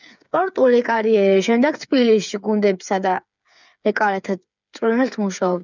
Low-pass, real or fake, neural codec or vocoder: 7.2 kHz; fake; codec, 16 kHz, 8 kbps, FreqCodec, smaller model